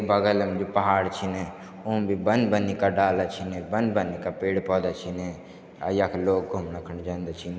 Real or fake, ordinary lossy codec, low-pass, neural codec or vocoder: real; none; none; none